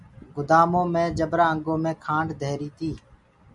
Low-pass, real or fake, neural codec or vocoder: 10.8 kHz; real; none